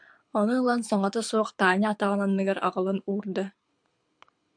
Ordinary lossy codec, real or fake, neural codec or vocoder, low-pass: MP3, 64 kbps; fake; codec, 24 kHz, 6 kbps, HILCodec; 9.9 kHz